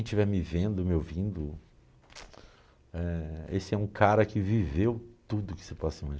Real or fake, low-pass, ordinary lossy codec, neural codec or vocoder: real; none; none; none